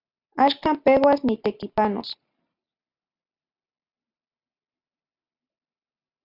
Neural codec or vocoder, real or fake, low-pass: none; real; 5.4 kHz